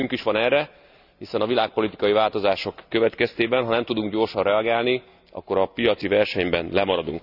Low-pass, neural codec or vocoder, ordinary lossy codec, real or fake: 5.4 kHz; none; none; real